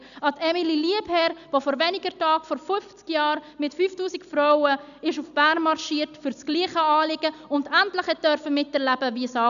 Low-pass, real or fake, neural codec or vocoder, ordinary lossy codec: 7.2 kHz; real; none; none